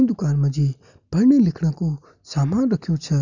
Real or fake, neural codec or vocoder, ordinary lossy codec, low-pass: real; none; none; 7.2 kHz